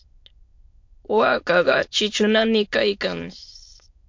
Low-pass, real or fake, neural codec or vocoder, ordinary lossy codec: 7.2 kHz; fake; autoencoder, 22.05 kHz, a latent of 192 numbers a frame, VITS, trained on many speakers; MP3, 48 kbps